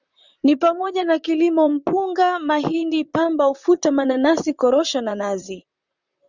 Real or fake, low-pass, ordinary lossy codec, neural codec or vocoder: fake; 7.2 kHz; Opus, 64 kbps; vocoder, 44.1 kHz, 128 mel bands, Pupu-Vocoder